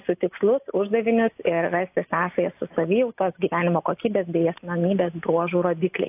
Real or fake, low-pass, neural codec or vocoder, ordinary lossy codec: real; 3.6 kHz; none; AAC, 32 kbps